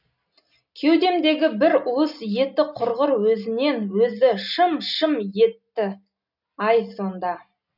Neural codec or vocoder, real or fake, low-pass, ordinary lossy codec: none; real; 5.4 kHz; none